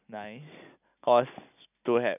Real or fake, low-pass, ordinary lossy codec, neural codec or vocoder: real; 3.6 kHz; none; none